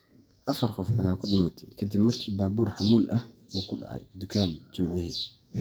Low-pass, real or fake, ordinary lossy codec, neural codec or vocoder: none; fake; none; codec, 44.1 kHz, 2.6 kbps, SNAC